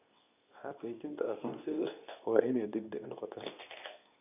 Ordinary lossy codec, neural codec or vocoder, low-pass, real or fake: none; codec, 24 kHz, 0.9 kbps, WavTokenizer, medium speech release version 2; 3.6 kHz; fake